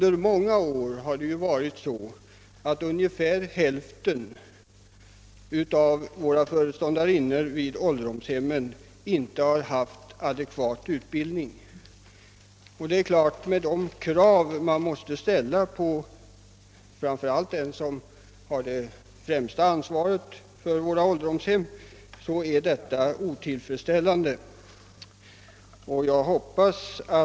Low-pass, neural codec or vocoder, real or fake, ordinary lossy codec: none; none; real; none